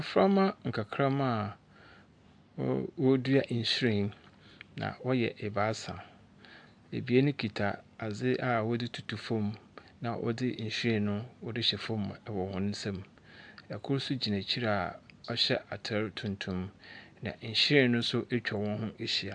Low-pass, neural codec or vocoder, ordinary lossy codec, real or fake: 9.9 kHz; none; AAC, 64 kbps; real